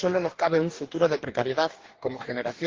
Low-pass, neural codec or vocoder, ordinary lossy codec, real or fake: 7.2 kHz; codec, 44.1 kHz, 2.6 kbps, DAC; Opus, 16 kbps; fake